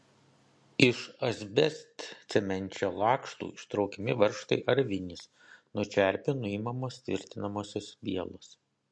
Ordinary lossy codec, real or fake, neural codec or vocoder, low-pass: MP3, 48 kbps; real; none; 9.9 kHz